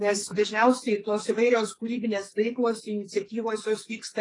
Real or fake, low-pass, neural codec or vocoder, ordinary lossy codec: fake; 10.8 kHz; codec, 44.1 kHz, 2.6 kbps, SNAC; AAC, 32 kbps